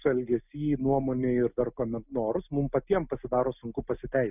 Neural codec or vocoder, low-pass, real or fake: none; 3.6 kHz; real